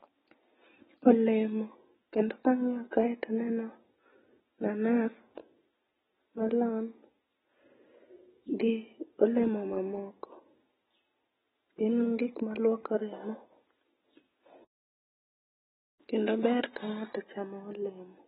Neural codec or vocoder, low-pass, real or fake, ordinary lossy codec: none; 7.2 kHz; real; AAC, 16 kbps